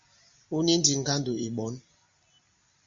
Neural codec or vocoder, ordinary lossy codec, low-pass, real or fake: none; Opus, 64 kbps; 7.2 kHz; real